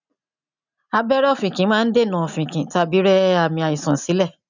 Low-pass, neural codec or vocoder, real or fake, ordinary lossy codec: 7.2 kHz; none; real; none